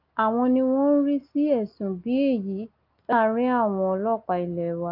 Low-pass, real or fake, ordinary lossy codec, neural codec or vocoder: 5.4 kHz; real; Opus, 32 kbps; none